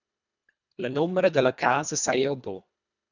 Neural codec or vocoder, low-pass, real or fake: codec, 24 kHz, 1.5 kbps, HILCodec; 7.2 kHz; fake